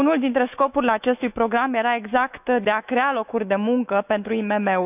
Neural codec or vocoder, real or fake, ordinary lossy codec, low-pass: autoencoder, 48 kHz, 128 numbers a frame, DAC-VAE, trained on Japanese speech; fake; none; 3.6 kHz